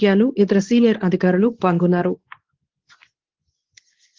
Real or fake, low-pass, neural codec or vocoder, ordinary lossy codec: fake; 7.2 kHz; codec, 24 kHz, 0.9 kbps, WavTokenizer, medium speech release version 2; Opus, 16 kbps